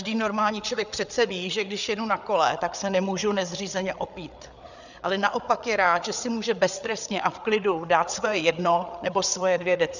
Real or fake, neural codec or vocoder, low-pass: fake; codec, 16 kHz, 8 kbps, FreqCodec, larger model; 7.2 kHz